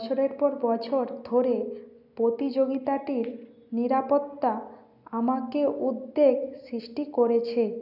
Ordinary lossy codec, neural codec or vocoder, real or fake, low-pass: none; none; real; 5.4 kHz